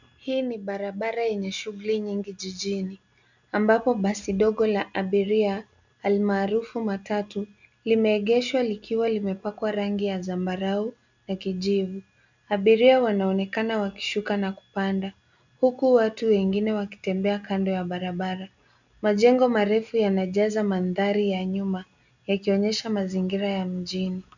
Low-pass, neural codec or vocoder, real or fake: 7.2 kHz; none; real